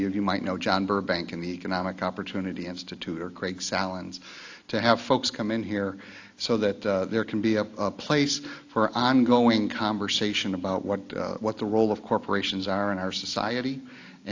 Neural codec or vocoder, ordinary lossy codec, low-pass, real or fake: none; AAC, 48 kbps; 7.2 kHz; real